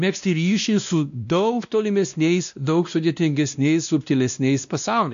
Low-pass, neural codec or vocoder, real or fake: 7.2 kHz; codec, 16 kHz, 1 kbps, X-Codec, WavLM features, trained on Multilingual LibriSpeech; fake